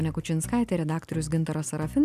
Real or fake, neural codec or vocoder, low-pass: real; none; 14.4 kHz